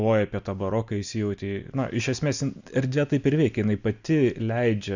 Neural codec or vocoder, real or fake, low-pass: none; real; 7.2 kHz